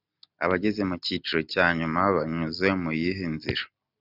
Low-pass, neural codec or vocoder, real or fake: 5.4 kHz; none; real